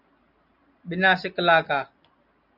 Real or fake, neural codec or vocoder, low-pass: real; none; 5.4 kHz